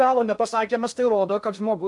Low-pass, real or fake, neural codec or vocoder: 10.8 kHz; fake; codec, 16 kHz in and 24 kHz out, 0.6 kbps, FocalCodec, streaming, 2048 codes